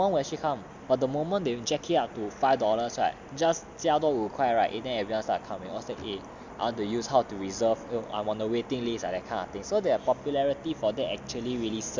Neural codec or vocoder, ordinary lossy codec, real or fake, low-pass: none; MP3, 64 kbps; real; 7.2 kHz